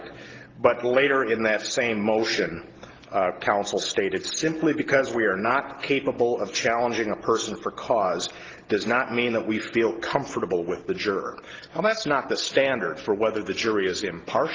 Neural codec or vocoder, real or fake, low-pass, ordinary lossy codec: none; real; 7.2 kHz; Opus, 16 kbps